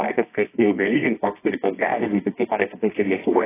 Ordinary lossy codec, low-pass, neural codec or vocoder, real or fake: AAC, 16 kbps; 3.6 kHz; codec, 24 kHz, 0.9 kbps, WavTokenizer, medium music audio release; fake